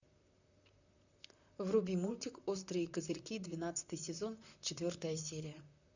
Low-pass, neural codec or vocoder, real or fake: 7.2 kHz; vocoder, 44.1 kHz, 128 mel bands, Pupu-Vocoder; fake